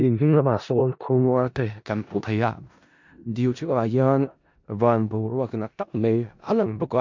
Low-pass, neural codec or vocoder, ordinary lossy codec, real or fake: 7.2 kHz; codec, 16 kHz in and 24 kHz out, 0.4 kbps, LongCat-Audio-Codec, four codebook decoder; MP3, 64 kbps; fake